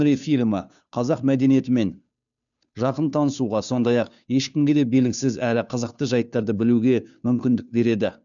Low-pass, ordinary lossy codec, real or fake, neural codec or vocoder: 7.2 kHz; none; fake; codec, 16 kHz, 2 kbps, FunCodec, trained on Chinese and English, 25 frames a second